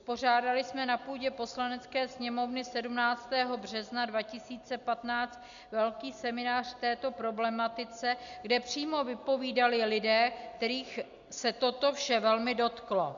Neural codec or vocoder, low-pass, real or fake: none; 7.2 kHz; real